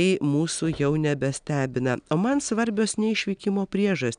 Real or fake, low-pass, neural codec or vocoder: real; 9.9 kHz; none